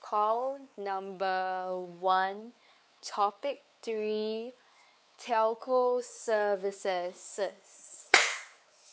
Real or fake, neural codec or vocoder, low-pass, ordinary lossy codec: fake; codec, 16 kHz, 4 kbps, X-Codec, WavLM features, trained on Multilingual LibriSpeech; none; none